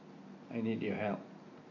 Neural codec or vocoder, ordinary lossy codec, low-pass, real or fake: none; MP3, 32 kbps; 7.2 kHz; real